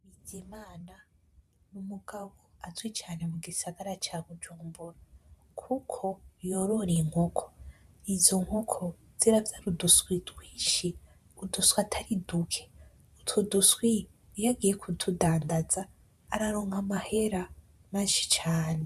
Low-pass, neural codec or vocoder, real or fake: 14.4 kHz; vocoder, 44.1 kHz, 128 mel bands every 512 samples, BigVGAN v2; fake